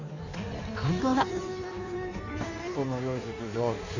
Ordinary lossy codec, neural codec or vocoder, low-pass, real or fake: none; codec, 16 kHz in and 24 kHz out, 1.1 kbps, FireRedTTS-2 codec; 7.2 kHz; fake